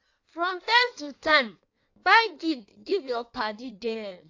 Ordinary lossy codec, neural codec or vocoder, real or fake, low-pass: AAC, 48 kbps; codec, 16 kHz in and 24 kHz out, 1.1 kbps, FireRedTTS-2 codec; fake; 7.2 kHz